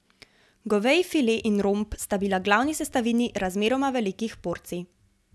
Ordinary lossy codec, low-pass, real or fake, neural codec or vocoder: none; none; real; none